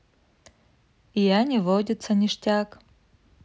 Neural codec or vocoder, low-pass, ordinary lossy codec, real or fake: none; none; none; real